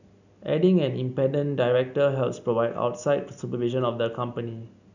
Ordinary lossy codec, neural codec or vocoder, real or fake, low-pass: none; none; real; 7.2 kHz